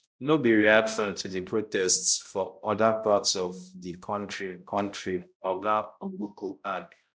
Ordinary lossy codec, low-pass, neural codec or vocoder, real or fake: none; none; codec, 16 kHz, 0.5 kbps, X-Codec, HuBERT features, trained on balanced general audio; fake